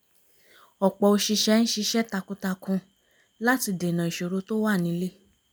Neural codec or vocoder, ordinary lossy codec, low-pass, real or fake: none; none; none; real